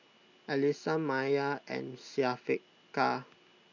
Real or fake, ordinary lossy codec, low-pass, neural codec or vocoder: real; none; 7.2 kHz; none